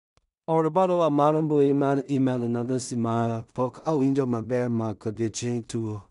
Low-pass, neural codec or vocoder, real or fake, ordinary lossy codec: 10.8 kHz; codec, 16 kHz in and 24 kHz out, 0.4 kbps, LongCat-Audio-Codec, two codebook decoder; fake; none